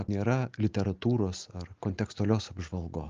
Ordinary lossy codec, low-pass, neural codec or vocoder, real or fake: Opus, 32 kbps; 7.2 kHz; none; real